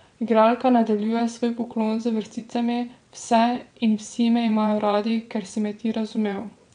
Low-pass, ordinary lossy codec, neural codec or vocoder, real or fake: 9.9 kHz; none; vocoder, 22.05 kHz, 80 mel bands, WaveNeXt; fake